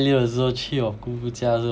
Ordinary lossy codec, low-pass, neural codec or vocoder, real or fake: none; none; none; real